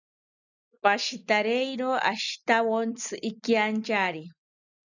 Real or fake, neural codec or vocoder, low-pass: real; none; 7.2 kHz